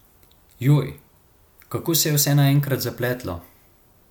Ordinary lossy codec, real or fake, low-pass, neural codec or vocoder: MP3, 96 kbps; real; 19.8 kHz; none